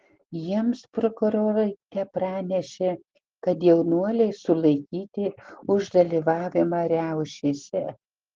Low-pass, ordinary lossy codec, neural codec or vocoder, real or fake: 7.2 kHz; Opus, 16 kbps; none; real